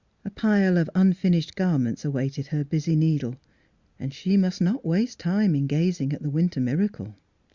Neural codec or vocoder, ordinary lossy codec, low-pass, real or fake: none; Opus, 64 kbps; 7.2 kHz; real